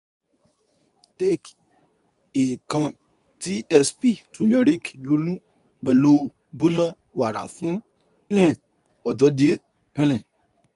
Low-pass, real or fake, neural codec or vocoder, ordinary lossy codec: 10.8 kHz; fake; codec, 24 kHz, 0.9 kbps, WavTokenizer, medium speech release version 2; none